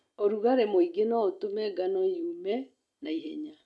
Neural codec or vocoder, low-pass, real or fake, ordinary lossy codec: none; none; real; none